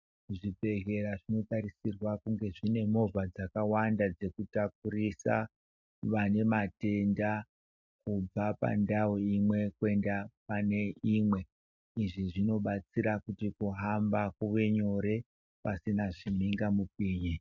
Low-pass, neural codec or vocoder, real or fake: 7.2 kHz; none; real